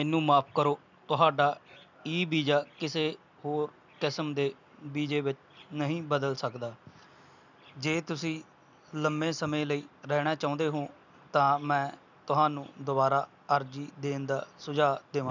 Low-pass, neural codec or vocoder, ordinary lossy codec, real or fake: 7.2 kHz; none; none; real